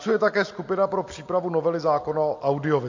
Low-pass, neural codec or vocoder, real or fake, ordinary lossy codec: 7.2 kHz; none; real; MP3, 48 kbps